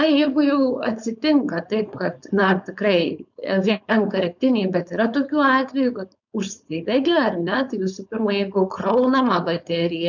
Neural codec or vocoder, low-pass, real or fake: codec, 16 kHz, 4.8 kbps, FACodec; 7.2 kHz; fake